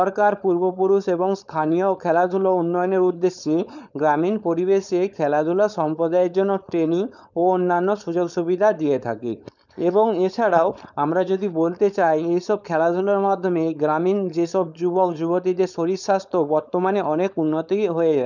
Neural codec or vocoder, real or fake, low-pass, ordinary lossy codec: codec, 16 kHz, 4.8 kbps, FACodec; fake; 7.2 kHz; none